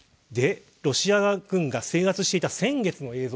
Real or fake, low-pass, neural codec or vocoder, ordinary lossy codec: real; none; none; none